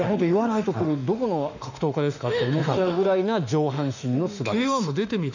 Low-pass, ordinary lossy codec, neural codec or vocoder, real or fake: 7.2 kHz; none; autoencoder, 48 kHz, 32 numbers a frame, DAC-VAE, trained on Japanese speech; fake